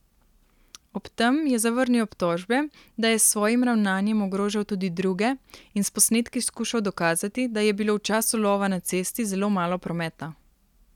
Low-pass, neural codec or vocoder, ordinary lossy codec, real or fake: 19.8 kHz; none; none; real